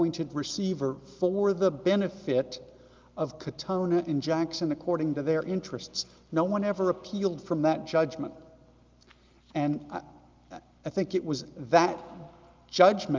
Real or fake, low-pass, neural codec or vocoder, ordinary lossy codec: real; 7.2 kHz; none; Opus, 32 kbps